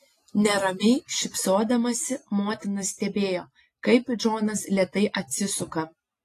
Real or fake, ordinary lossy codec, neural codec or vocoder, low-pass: real; AAC, 48 kbps; none; 14.4 kHz